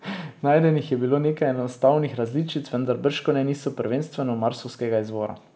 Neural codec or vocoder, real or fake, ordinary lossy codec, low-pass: none; real; none; none